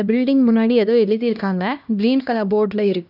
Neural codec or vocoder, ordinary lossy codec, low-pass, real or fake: codec, 16 kHz, 1 kbps, X-Codec, HuBERT features, trained on LibriSpeech; none; 5.4 kHz; fake